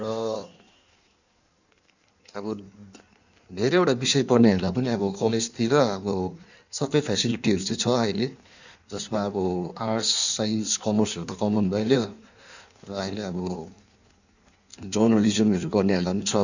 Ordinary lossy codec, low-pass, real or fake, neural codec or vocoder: none; 7.2 kHz; fake; codec, 16 kHz in and 24 kHz out, 1.1 kbps, FireRedTTS-2 codec